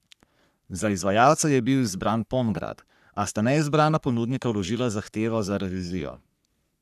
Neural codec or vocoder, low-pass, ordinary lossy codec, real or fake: codec, 44.1 kHz, 3.4 kbps, Pupu-Codec; 14.4 kHz; none; fake